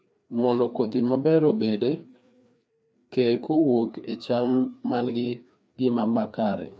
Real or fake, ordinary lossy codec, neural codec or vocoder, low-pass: fake; none; codec, 16 kHz, 2 kbps, FreqCodec, larger model; none